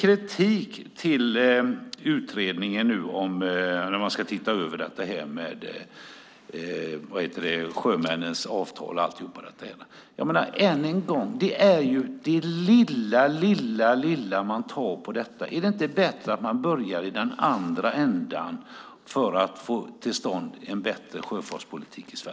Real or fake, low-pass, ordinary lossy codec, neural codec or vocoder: real; none; none; none